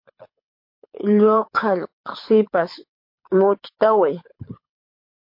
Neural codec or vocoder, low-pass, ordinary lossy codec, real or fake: codec, 24 kHz, 6 kbps, HILCodec; 5.4 kHz; MP3, 32 kbps; fake